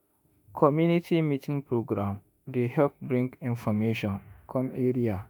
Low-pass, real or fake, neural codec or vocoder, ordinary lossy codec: none; fake; autoencoder, 48 kHz, 32 numbers a frame, DAC-VAE, trained on Japanese speech; none